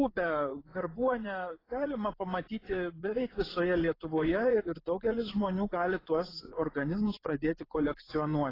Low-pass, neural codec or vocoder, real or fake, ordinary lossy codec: 5.4 kHz; vocoder, 24 kHz, 100 mel bands, Vocos; fake; AAC, 24 kbps